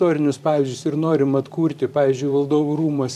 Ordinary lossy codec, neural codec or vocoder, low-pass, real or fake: MP3, 96 kbps; vocoder, 44.1 kHz, 128 mel bands every 512 samples, BigVGAN v2; 14.4 kHz; fake